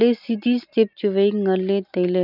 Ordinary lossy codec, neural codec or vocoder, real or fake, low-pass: none; none; real; 5.4 kHz